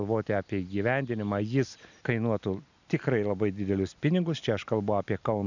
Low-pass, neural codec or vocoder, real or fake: 7.2 kHz; none; real